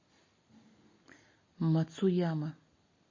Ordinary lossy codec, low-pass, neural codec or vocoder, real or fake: MP3, 32 kbps; 7.2 kHz; none; real